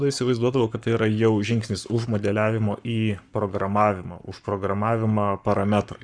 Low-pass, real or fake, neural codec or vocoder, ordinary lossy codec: 9.9 kHz; fake; codec, 44.1 kHz, 7.8 kbps, Pupu-Codec; Opus, 64 kbps